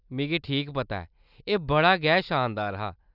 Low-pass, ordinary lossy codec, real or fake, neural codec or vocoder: 5.4 kHz; none; real; none